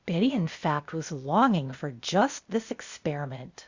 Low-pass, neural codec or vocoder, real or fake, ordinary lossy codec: 7.2 kHz; codec, 16 kHz, 0.8 kbps, ZipCodec; fake; Opus, 64 kbps